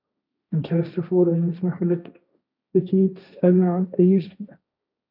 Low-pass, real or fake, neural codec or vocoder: 5.4 kHz; fake; codec, 16 kHz, 1.1 kbps, Voila-Tokenizer